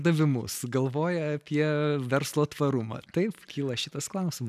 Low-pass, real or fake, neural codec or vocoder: 14.4 kHz; real; none